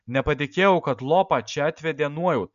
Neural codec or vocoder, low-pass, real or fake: none; 7.2 kHz; real